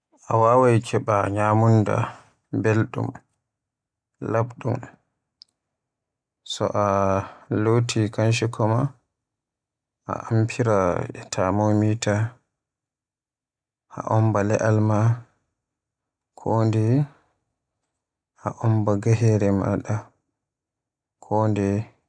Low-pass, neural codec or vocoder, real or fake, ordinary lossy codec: 9.9 kHz; none; real; none